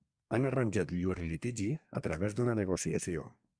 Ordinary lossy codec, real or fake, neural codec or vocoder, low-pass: Opus, 64 kbps; fake; codec, 24 kHz, 1 kbps, SNAC; 9.9 kHz